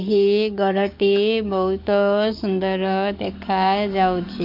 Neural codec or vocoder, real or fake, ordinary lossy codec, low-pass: codec, 44.1 kHz, 7.8 kbps, DAC; fake; MP3, 48 kbps; 5.4 kHz